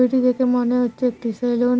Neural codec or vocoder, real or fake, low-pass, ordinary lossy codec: none; real; none; none